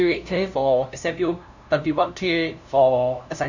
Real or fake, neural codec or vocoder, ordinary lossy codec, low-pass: fake; codec, 16 kHz, 0.5 kbps, FunCodec, trained on LibriTTS, 25 frames a second; none; 7.2 kHz